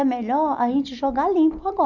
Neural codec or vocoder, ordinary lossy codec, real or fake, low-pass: none; none; real; 7.2 kHz